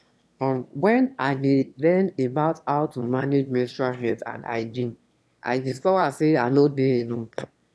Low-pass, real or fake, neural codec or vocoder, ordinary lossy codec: none; fake; autoencoder, 22.05 kHz, a latent of 192 numbers a frame, VITS, trained on one speaker; none